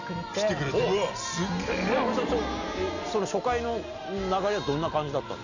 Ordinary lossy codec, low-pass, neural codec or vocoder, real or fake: none; 7.2 kHz; none; real